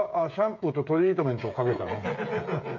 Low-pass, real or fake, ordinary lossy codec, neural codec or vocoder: 7.2 kHz; fake; none; codec, 16 kHz, 16 kbps, FreqCodec, smaller model